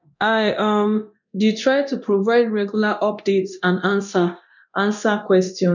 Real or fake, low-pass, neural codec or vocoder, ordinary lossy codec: fake; 7.2 kHz; codec, 24 kHz, 0.9 kbps, DualCodec; none